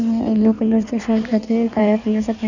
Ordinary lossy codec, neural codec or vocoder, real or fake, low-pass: none; codec, 16 kHz in and 24 kHz out, 1.1 kbps, FireRedTTS-2 codec; fake; 7.2 kHz